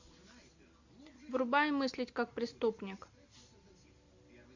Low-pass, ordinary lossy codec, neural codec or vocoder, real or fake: 7.2 kHz; AAC, 48 kbps; none; real